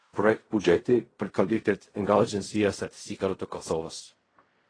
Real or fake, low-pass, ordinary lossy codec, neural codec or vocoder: fake; 9.9 kHz; AAC, 32 kbps; codec, 16 kHz in and 24 kHz out, 0.4 kbps, LongCat-Audio-Codec, fine tuned four codebook decoder